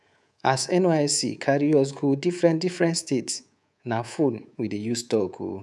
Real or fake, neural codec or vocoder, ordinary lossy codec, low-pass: fake; codec, 24 kHz, 3.1 kbps, DualCodec; none; none